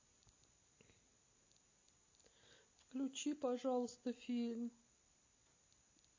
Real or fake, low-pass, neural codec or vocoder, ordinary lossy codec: real; 7.2 kHz; none; MP3, 32 kbps